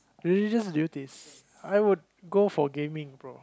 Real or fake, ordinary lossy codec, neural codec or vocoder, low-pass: real; none; none; none